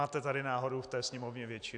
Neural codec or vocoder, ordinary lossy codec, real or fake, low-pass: none; AAC, 64 kbps; real; 9.9 kHz